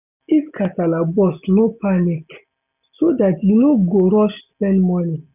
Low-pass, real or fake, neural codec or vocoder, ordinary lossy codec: 3.6 kHz; real; none; none